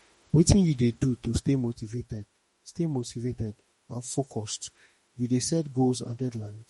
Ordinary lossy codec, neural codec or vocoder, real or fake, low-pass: MP3, 48 kbps; autoencoder, 48 kHz, 32 numbers a frame, DAC-VAE, trained on Japanese speech; fake; 19.8 kHz